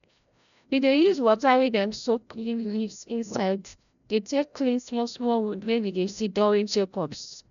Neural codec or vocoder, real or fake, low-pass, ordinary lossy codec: codec, 16 kHz, 0.5 kbps, FreqCodec, larger model; fake; 7.2 kHz; none